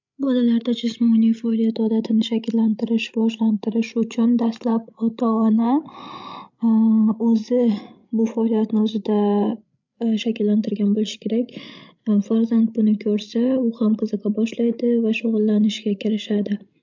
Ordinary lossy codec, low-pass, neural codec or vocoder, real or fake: MP3, 64 kbps; 7.2 kHz; codec, 16 kHz, 16 kbps, FreqCodec, larger model; fake